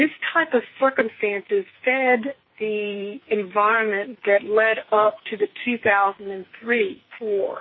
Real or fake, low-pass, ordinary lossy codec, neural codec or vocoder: fake; 7.2 kHz; MP3, 24 kbps; codec, 44.1 kHz, 2.6 kbps, SNAC